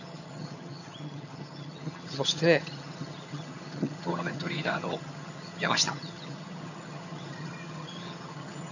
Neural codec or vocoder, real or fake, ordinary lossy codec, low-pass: vocoder, 22.05 kHz, 80 mel bands, HiFi-GAN; fake; none; 7.2 kHz